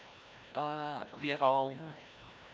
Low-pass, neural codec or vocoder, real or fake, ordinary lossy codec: none; codec, 16 kHz, 1 kbps, FreqCodec, larger model; fake; none